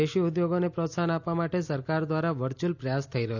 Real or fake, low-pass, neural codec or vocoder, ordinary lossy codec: real; 7.2 kHz; none; none